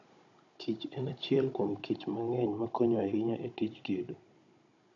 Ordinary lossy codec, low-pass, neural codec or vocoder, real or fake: none; 7.2 kHz; codec, 16 kHz, 16 kbps, FunCodec, trained on Chinese and English, 50 frames a second; fake